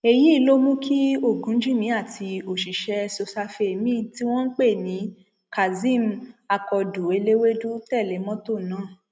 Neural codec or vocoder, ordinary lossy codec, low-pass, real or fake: none; none; none; real